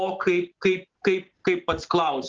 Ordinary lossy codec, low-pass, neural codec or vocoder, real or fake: Opus, 16 kbps; 7.2 kHz; none; real